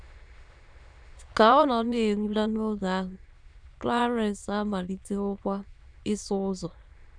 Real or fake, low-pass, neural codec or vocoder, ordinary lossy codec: fake; 9.9 kHz; autoencoder, 22.05 kHz, a latent of 192 numbers a frame, VITS, trained on many speakers; none